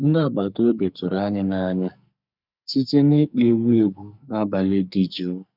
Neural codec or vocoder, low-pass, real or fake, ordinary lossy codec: codec, 44.1 kHz, 2.6 kbps, SNAC; 5.4 kHz; fake; none